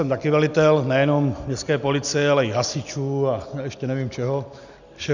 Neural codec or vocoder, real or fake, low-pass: none; real; 7.2 kHz